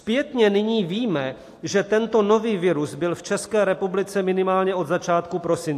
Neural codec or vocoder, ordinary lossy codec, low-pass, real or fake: none; AAC, 64 kbps; 14.4 kHz; real